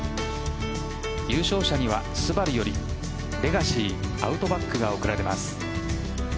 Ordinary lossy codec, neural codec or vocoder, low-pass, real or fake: none; none; none; real